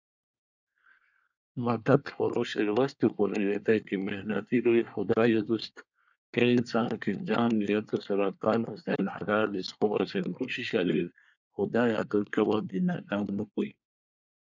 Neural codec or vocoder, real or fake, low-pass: codec, 24 kHz, 1 kbps, SNAC; fake; 7.2 kHz